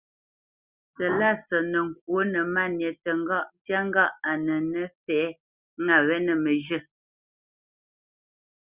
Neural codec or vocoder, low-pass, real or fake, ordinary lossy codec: none; 3.6 kHz; real; Opus, 64 kbps